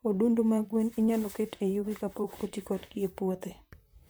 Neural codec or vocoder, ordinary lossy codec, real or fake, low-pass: vocoder, 44.1 kHz, 128 mel bands, Pupu-Vocoder; none; fake; none